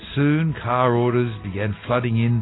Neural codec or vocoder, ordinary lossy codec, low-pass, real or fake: none; AAC, 16 kbps; 7.2 kHz; real